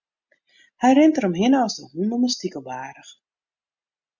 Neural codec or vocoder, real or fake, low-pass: none; real; 7.2 kHz